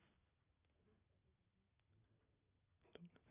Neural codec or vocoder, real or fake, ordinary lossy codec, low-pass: none; real; none; 3.6 kHz